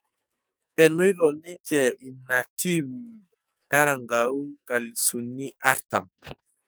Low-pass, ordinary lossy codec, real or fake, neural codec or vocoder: none; none; fake; codec, 44.1 kHz, 2.6 kbps, SNAC